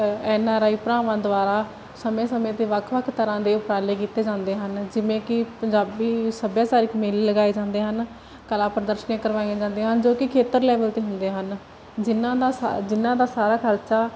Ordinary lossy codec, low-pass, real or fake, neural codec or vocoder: none; none; real; none